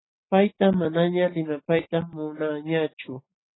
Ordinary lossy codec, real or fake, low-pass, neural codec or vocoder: AAC, 16 kbps; real; 7.2 kHz; none